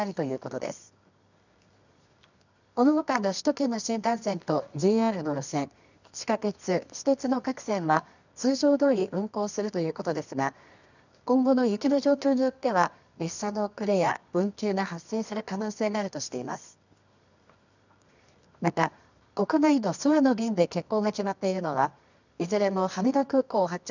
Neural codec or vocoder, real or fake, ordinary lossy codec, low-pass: codec, 24 kHz, 0.9 kbps, WavTokenizer, medium music audio release; fake; none; 7.2 kHz